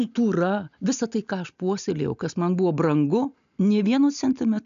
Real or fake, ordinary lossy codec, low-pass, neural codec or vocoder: real; AAC, 96 kbps; 7.2 kHz; none